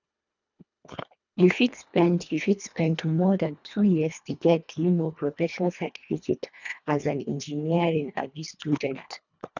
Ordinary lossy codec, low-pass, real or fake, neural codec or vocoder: none; 7.2 kHz; fake; codec, 24 kHz, 1.5 kbps, HILCodec